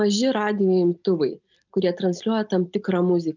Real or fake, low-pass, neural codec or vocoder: real; 7.2 kHz; none